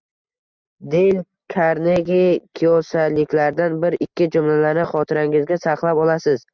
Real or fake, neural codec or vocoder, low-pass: real; none; 7.2 kHz